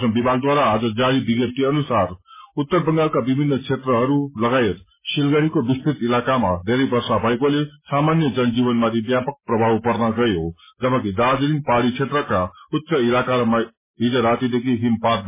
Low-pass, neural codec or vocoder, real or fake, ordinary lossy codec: 3.6 kHz; none; real; MP3, 16 kbps